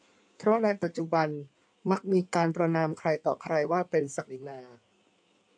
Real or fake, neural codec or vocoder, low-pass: fake; codec, 16 kHz in and 24 kHz out, 1.1 kbps, FireRedTTS-2 codec; 9.9 kHz